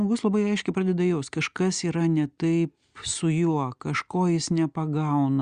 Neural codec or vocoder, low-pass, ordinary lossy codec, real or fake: none; 9.9 kHz; Opus, 64 kbps; real